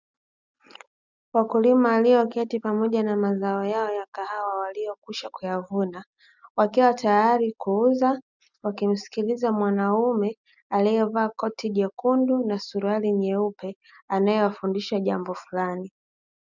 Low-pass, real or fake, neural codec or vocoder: 7.2 kHz; real; none